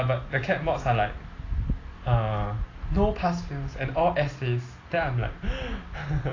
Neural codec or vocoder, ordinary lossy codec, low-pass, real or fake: none; AAC, 32 kbps; 7.2 kHz; real